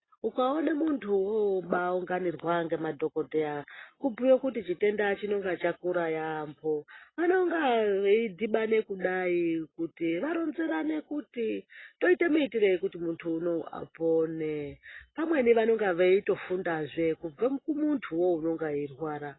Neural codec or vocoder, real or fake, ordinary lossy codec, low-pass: none; real; AAC, 16 kbps; 7.2 kHz